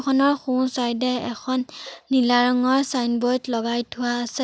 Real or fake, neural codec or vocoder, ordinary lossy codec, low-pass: real; none; none; none